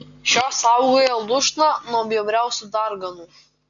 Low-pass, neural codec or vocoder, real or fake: 7.2 kHz; none; real